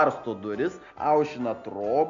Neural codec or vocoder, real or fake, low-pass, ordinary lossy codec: none; real; 7.2 kHz; MP3, 64 kbps